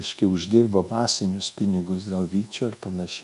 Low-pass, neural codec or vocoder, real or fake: 10.8 kHz; codec, 24 kHz, 1.2 kbps, DualCodec; fake